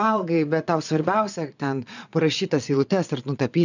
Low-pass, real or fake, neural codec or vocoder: 7.2 kHz; fake; vocoder, 22.05 kHz, 80 mel bands, Vocos